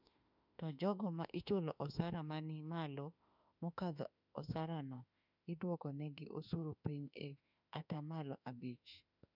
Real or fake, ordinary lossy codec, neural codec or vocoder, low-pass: fake; none; autoencoder, 48 kHz, 32 numbers a frame, DAC-VAE, trained on Japanese speech; 5.4 kHz